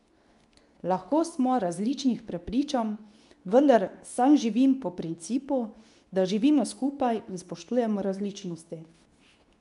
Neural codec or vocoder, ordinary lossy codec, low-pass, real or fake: codec, 24 kHz, 0.9 kbps, WavTokenizer, medium speech release version 2; none; 10.8 kHz; fake